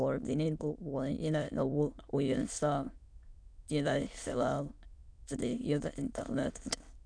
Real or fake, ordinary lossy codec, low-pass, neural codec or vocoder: fake; MP3, 96 kbps; 9.9 kHz; autoencoder, 22.05 kHz, a latent of 192 numbers a frame, VITS, trained on many speakers